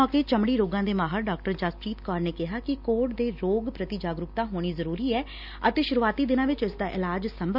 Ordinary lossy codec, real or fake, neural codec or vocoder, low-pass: none; real; none; 5.4 kHz